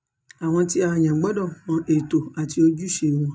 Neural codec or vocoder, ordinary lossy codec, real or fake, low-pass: none; none; real; none